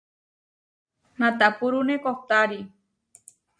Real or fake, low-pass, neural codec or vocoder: real; 9.9 kHz; none